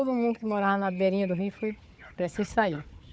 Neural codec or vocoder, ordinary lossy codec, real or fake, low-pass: codec, 16 kHz, 4 kbps, FunCodec, trained on Chinese and English, 50 frames a second; none; fake; none